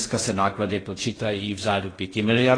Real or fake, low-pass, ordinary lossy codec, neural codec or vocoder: fake; 9.9 kHz; AAC, 32 kbps; codec, 16 kHz in and 24 kHz out, 0.6 kbps, FocalCodec, streaming, 4096 codes